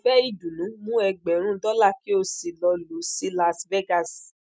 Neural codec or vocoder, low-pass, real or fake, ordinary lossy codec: none; none; real; none